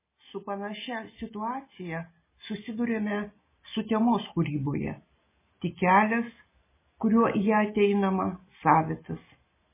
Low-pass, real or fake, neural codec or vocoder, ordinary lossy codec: 3.6 kHz; real; none; MP3, 16 kbps